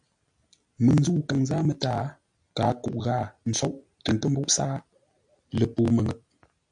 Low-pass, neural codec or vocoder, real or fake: 9.9 kHz; none; real